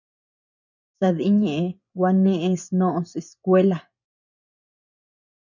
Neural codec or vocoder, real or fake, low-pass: vocoder, 24 kHz, 100 mel bands, Vocos; fake; 7.2 kHz